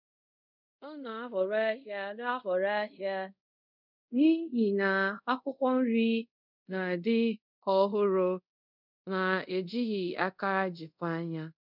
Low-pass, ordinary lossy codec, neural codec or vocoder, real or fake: 5.4 kHz; none; codec, 24 kHz, 0.5 kbps, DualCodec; fake